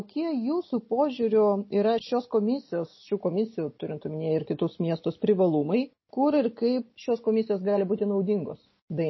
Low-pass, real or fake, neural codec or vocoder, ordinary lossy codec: 7.2 kHz; real; none; MP3, 24 kbps